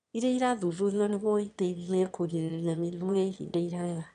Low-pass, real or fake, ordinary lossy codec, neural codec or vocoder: 9.9 kHz; fake; AAC, 96 kbps; autoencoder, 22.05 kHz, a latent of 192 numbers a frame, VITS, trained on one speaker